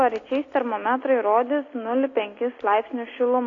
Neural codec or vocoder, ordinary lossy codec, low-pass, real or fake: none; AAC, 32 kbps; 7.2 kHz; real